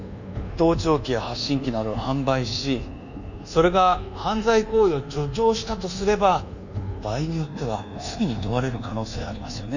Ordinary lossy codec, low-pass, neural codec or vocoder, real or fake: none; 7.2 kHz; codec, 24 kHz, 1.2 kbps, DualCodec; fake